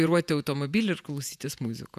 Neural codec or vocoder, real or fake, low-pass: vocoder, 48 kHz, 128 mel bands, Vocos; fake; 14.4 kHz